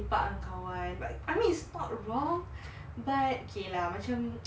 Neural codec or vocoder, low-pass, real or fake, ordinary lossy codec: none; none; real; none